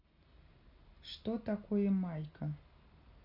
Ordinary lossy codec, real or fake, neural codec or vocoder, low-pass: none; real; none; 5.4 kHz